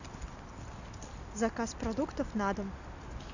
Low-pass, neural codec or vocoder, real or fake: 7.2 kHz; none; real